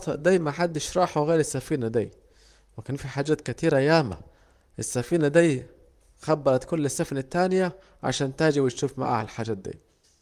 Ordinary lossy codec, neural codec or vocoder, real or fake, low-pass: Opus, 32 kbps; vocoder, 44.1 kHz, 128 mel bands, Pupu-Vocoder; fake; 14.4 kHz